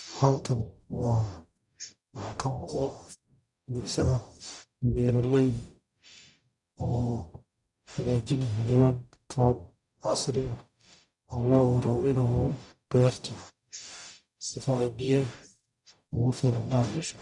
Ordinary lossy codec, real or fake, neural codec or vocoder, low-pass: none; fake; codec, 44.1 kHz, 0.9 kbps, DAC; 10.8 kHz